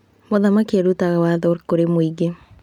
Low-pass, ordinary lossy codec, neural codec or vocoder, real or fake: 19.8 kHz; none; none; real